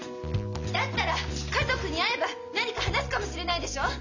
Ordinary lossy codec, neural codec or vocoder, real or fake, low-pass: none; none; real; 7.2 kHz